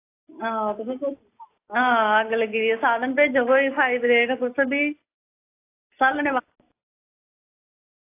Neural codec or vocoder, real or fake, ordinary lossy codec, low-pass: none; real; AAC, 24 kbps; 3.6 kHz